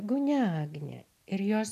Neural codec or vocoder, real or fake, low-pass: none; real; 14.4 kHz